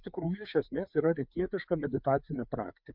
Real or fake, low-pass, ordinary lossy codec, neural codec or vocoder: fake; 5.4 kHz; MP3, 48 kbps; codec, 16 kHz, 4 kbps, FreqCodec, larger model